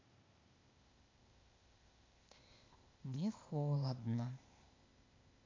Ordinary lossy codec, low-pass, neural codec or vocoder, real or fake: MP3, 48 kbps; 7.2 kHz; codec, 16 kHz, 0.8 kbps, ZipCodec; fake